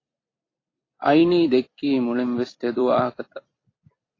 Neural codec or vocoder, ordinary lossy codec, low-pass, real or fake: vocoder, 44.1 kHz, 128 mel bands every 256 samples, BigVGAN v2; AAC, 32 kbps; 7.2 kHz; fake